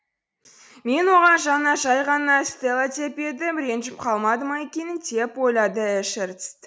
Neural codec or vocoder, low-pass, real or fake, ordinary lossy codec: none; none; real; none